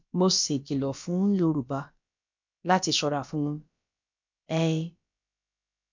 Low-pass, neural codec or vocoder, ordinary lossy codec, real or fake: 7.2 kHz; codec, 16 kHz, about 1 kbps, DyCAST, with the encoder's durations; MP3, 64 kbps; fake